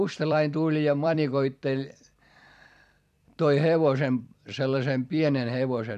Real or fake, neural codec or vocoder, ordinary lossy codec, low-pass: fake; vocoder, 44.1 kHz, 128 mel bands every 256 samples, BigVGAN v2; none; 14.4 kHz